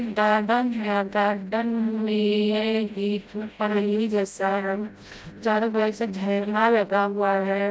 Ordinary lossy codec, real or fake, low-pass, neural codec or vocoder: none; fake; none; codec, 16 kHz, 0.5 kbps, FreqCodec, smaller model